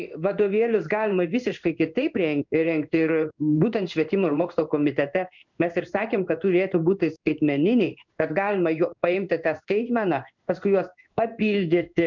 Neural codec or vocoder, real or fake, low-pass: codec, 16 kHz in and 24 kHz out, 1 kbps, XY-Tokenizer; fake; 7.2 kHz